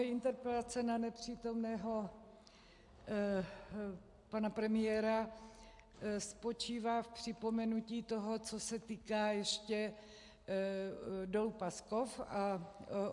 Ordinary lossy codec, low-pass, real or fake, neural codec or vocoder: AAC, 64 kbps; 10.8 kHz; real; none